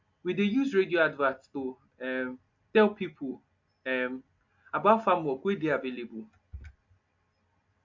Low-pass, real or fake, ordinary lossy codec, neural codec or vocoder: 7.2 kHz; real; MP3, 48 kbps; none